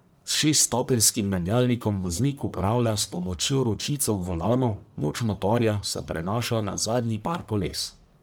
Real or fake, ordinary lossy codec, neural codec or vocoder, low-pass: fake; none; codec, 44.1 kHz, 1.7 kbps, Pupu-Codec; none